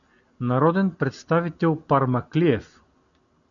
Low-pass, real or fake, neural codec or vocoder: 7.2 kHz; real; none